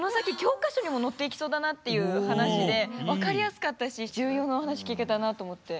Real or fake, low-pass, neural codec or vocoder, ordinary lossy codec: real; none; none; none